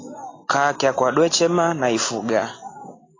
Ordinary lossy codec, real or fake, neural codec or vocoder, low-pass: AAC, 32 kbps; real; none; 7.2 kHz